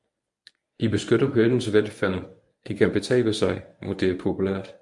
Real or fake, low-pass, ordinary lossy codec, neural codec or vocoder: fake; 10.8 kHz; MP3, 64 kbps; codec, 24 kHz, 0.9 kbps, WavTokenizer, medium speech release version 1